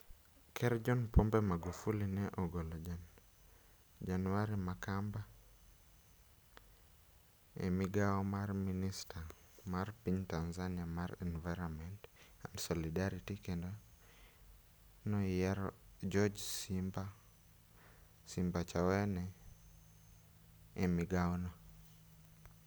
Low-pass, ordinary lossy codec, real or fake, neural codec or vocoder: none; none; real; none